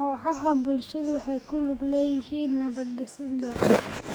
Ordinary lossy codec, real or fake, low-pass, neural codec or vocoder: none; fake; none; codec, 44.1 kHz, 2.6 kbps, DAC